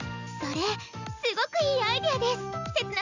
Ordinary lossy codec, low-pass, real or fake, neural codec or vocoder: none; 7.2 kHz; real; none